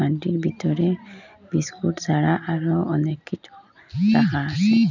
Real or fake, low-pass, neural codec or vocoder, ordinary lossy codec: real; 7.2 kHz; none; none